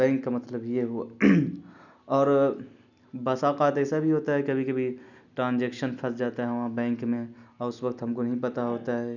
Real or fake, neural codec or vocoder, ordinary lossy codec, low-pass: real; none; none; 7.2 kHz